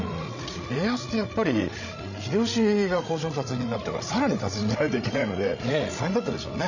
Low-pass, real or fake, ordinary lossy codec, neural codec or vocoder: 7.2 kHz; fake; none; codec, 16 kHz, 16 kbps, FreqCodec, larger model